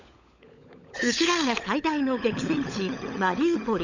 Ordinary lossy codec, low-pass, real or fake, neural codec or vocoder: none; 7.2 kHz; fake; codec, 16 kHz, 16 kbps, FunCodec, trained on LibriTTS, 50 frames a second